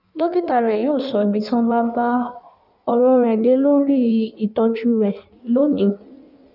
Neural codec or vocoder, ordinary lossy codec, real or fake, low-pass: codec, 16 kHz in and 24 kHz out, 1.1 kbps, FireRedTTS-2 codec; none; fake; 5.4 kHz